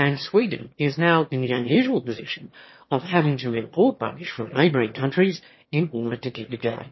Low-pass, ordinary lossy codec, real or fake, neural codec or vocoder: 7.2 kHz; MP3, 24 kbps; fake; autoencoder, 22.05 kHz, a latent of 192 numbers a frame, VITS, trained on one speaker